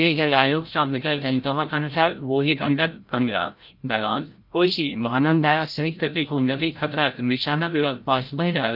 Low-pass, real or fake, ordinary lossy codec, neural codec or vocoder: 5.4 kHz; fake; Opus, 24 kbps; codec, 16 kHz, 0.5 kbps, FreqCodec, larger model